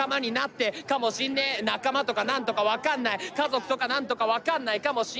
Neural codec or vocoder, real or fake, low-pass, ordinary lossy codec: none; real; none; none